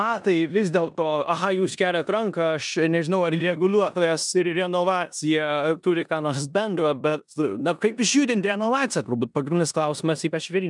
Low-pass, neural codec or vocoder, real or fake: 10.8 kHz; codec, 16 kHz in and 24 kHz out, 0.9 kbps, LongCat-Audio-Codec, four codebook decoder; fake